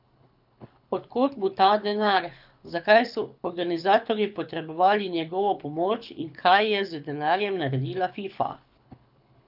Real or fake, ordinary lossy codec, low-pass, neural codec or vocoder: fake; none; 5.4 kHz; codec, 24 kHz, 6 kbps, HILCodec